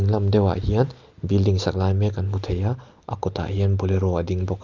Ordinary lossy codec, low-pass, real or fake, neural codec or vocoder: Opus, 24 kbps; 7.2 kHz; real; none